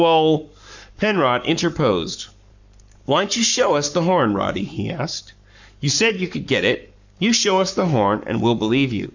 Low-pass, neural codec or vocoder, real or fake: 7.2 kHz; codec, 44.1 kHz, 7.8 kbps, Pupu-Codec; fake